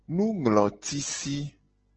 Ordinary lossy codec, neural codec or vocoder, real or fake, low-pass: Opus, 16 kbps; none; real; 7.2 kHz